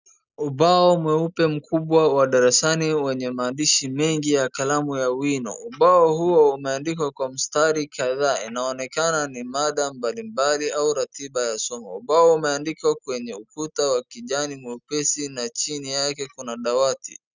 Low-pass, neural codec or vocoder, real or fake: 7.2 kHz; none; real